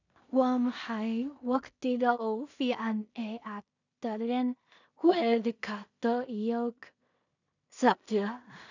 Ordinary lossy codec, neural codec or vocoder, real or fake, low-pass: none; codec, 16 kHz in and 24 kHz out, 0.4 kbps, LongCat-Audio-Codec, two codebook decoder; fake; 7.2 kHz